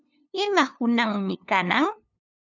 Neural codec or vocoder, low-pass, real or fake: codec, 16 kHz, 2 kbps, FunCodec, trained on LibriTTS, 25 frames a second; 7.2 kHz; fake